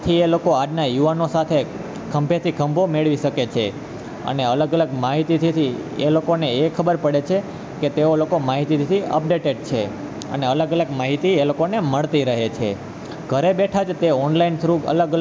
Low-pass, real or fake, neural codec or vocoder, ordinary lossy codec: none; real; none; none